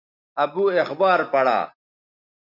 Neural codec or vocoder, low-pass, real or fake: none; 5.4 kHz; real